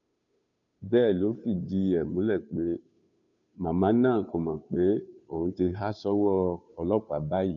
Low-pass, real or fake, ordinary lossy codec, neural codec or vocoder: 7.2 kHz; fake; none; codec, 16 kHz, 2 kbps, FunCodec, trained on Chinese and English, 25 frames a second